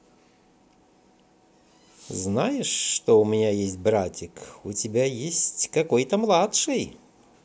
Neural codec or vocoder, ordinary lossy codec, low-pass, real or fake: none; none; none; real